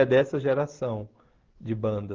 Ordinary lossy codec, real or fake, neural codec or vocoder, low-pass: Opus, 16 kbps; real; none; 7.2 kHz